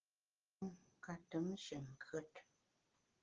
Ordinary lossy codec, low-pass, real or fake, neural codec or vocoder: Opus, 16 kbps; 7.2 kHz; real; none